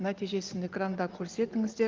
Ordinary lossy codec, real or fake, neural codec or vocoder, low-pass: Opus, 32 kbps; real; none; 7.2 kHz